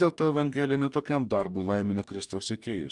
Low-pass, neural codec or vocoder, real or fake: 10.8 kHz; codec, 44.1 kHz, 2.6 kbps, DAC; fake